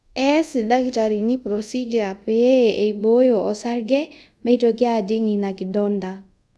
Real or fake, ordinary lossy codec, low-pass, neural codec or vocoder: fake; none; none; codec, 24 kHz, 0.5 kbps, DualCodec